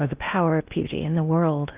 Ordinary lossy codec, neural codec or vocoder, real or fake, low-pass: Opus, 24 kbps; codec, 16 kHz in and 24 kHz out, 0.6 kbps, FocalCodec, streaming, 4096 codes; fake; 3.6 kHz